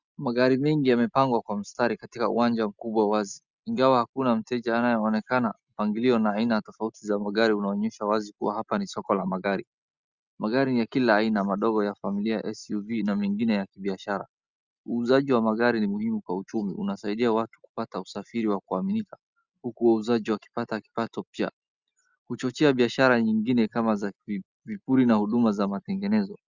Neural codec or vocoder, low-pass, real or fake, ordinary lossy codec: none; 7.2 kHz; real; Opus, 64 kbps